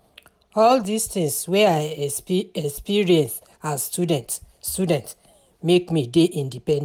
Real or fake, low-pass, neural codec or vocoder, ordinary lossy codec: real; none; none; none